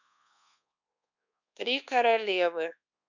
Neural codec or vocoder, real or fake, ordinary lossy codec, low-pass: codec, 24 kHz, 1.2 kbps, DualCodec; fake; none; 7.2 kHz